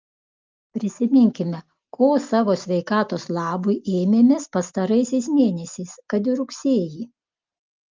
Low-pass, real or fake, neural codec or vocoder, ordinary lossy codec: 7.2 kHz; real; none; Opus, 24 kbps